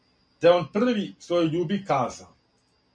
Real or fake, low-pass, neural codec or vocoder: real; 9.9 kHz; none